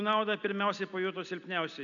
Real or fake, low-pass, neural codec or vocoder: real; 7.2 kHz; none